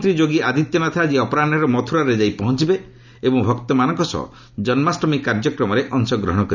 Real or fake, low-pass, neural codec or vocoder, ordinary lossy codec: real; 7.2 kHz; none; none